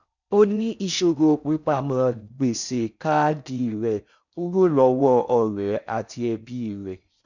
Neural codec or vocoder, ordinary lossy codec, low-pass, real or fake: codec, 16 kHz in and 24 kHz out, 0.6 kbps, FocalCodec, streaming, 4096 codes; none; 7.2 kHz; fake